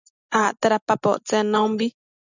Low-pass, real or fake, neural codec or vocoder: 7.2 kHz; real; none